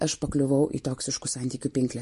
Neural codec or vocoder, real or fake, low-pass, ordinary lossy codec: none; real; 14.4 kHz; MP3, 48 kbps